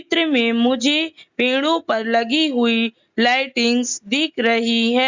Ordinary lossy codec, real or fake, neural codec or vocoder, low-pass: Opus, 64 kbps; real; none; 7.2 kHz